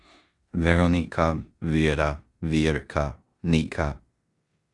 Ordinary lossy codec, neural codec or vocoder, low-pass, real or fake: Opus, 64 kbps; codec, 16 kHz in and 24 kHz out, 0.9 kbps, LongCat-Audio-Codec, four codebook decoder; 10.8 kHz; fake